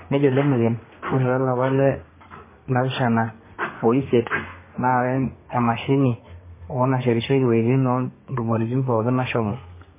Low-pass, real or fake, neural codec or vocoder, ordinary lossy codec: 3.6 kHz; fake; codec, 32 kHz, 1.9 kbps, SNAC; MP3, 16 kbps